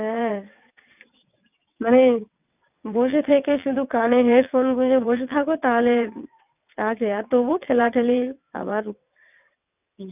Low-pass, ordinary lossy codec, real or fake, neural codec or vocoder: 3.6 kHz; none; fake; vocoder, 22.05 kHz, 80 mel bands, WaveNeXt